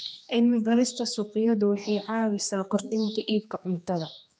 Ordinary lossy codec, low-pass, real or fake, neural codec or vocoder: none; none; fake; codec, 16 kHz, 2 kbps, X-Codec, HuBERT features, trained on general audio